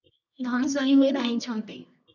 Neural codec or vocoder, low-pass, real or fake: codec, 24 kHz, 0.9 kbps, WavTokenizer, medium music audio release; 7.2 kHz; fake